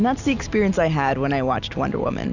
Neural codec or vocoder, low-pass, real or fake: none; 7.2 kHz; real